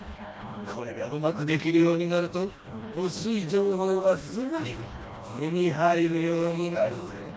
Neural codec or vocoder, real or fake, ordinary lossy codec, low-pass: codec, 16 kHz, 1 kbps, FreqCodec, smaller model; fake; none; none